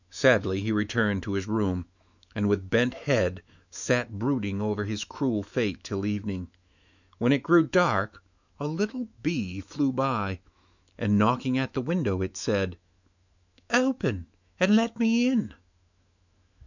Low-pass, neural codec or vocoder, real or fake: 7.2 kHz; autoencoder, 48 kHz, 128 numbers a frame, DAC-VAE, trained on Japanese speech; fake